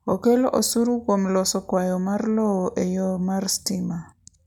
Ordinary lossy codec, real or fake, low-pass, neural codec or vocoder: none; real; 19.8 kHz; none